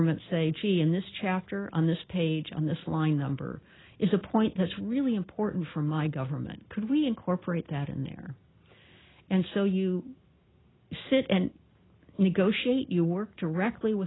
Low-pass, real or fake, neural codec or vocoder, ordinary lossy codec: 7.2 kHz; real; none; AAC, 16 kbps